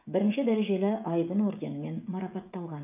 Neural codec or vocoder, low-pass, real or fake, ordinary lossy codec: codec, 16 kHz, 16 kbps, FreqCodec, smaller model; 3.6 kHz; fake; none